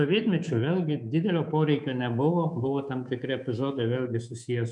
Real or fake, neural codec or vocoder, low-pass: fake; codec, 24 kHz, 3.1 kbps, DualCodec; 10.8 kHz